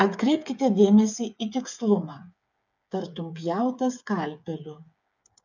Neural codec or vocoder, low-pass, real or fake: codec, 16 kHz, 8 kbps, FreqCodec, smaller model; 7.2 kHz; fake